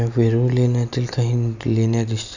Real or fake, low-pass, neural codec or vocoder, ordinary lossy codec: real; 7.2 kHz; none; none